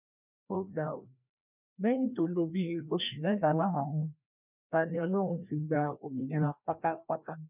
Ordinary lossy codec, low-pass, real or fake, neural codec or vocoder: none; 3.6 kHz; fake; codec, 16 kHz, 1 kbps, FreqCodec, larger model